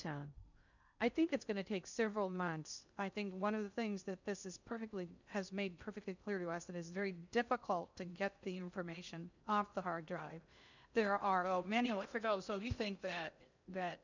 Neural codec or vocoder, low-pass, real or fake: codec, 16 kHz in and 24 kHz out, 0.6 kbps, FocalCodec, streaming, 2048 codes; 7.2 kHz; fake